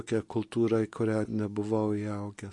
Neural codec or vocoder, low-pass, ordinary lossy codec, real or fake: none; 10.8 kHz; MP3, 48 kbps; real